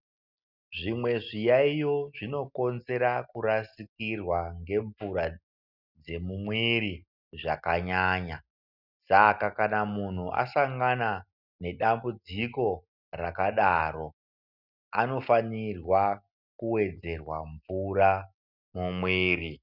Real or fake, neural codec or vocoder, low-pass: real; none; 5.4 kHz